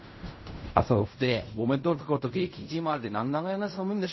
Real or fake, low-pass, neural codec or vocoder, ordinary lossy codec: fake; 7.2 kHz; codec, 16 kHz in and 24 kHz out, 0.4 kbps, LongCat-Audio-Codec, fine tuned four codebook decoder; MP3, 24 kbps